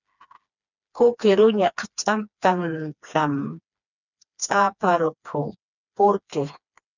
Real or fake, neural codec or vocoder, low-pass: fake; codec, 16 kHz, 2 kbps, FreqCodec, smaller model; 7.2 kHz